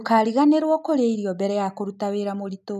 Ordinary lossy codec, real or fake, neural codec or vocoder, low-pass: none; real; none; 14.4 kHz